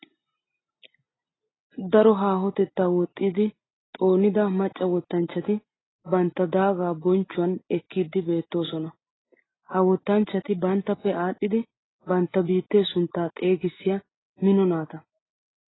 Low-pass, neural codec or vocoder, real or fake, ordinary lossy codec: 7.2 kHz; none; real; AAC, 16 kbps